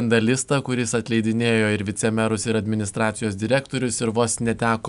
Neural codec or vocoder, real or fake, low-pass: none; real; 10.8 kHz